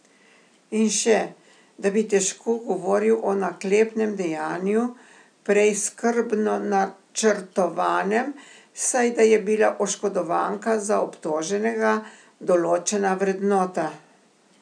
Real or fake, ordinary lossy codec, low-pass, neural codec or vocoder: real; none; 9.9 kHz; none